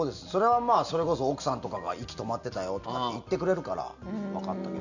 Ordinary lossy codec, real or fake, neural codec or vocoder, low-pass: none; real; none; 7.2 kHz